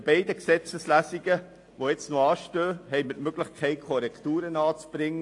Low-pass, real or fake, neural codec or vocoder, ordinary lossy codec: 10.8 kHz; real; none; AAC, 48 kbps